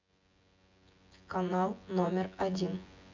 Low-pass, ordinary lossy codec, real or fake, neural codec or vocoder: 7.2 kHz; MP3, 64 kbps; fake; vocoder, 24 kHz, 100 mel bands, Vocos